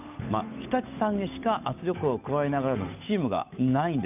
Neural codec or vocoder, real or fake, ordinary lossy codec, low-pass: codec, 16 kHz, 8 kbps, FunCodec, trained on Chinese and English, 25 frames a second; fake; none; 3.6 kHz